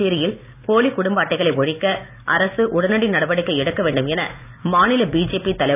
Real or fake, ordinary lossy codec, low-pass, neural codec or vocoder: real; none; 3.6 kHz; none